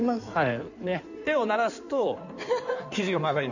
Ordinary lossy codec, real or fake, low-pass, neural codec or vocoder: none; fake; 7.2 kHz; codec, 16 kHz in and 24 kHz out, 2.2 kbps, FireRedTTS-2 codec